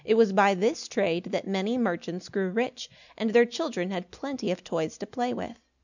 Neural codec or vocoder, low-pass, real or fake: none; 7.2 kHz; real